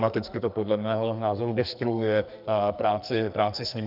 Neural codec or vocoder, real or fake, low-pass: codec, 44.1 kHz, 2.6 kbps, SNAC; fake; 5.4 kHz